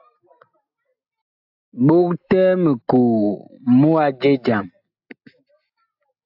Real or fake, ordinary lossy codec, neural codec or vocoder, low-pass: real; AAC, 48 kbps; none; 5.4 kHz